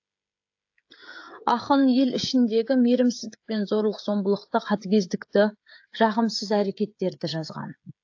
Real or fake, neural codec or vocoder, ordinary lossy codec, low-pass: fake; codec, 16 kHz, 16 kbps, FreqCodec, smaller model; AAC, 48 kbps; 7.2 kHz